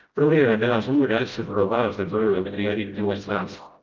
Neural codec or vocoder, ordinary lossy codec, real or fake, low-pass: codec, 16 kHz, 0.5 kbps, FreqCodec, smaller model; Opus, 24 kbps; fake; 7.2 kHz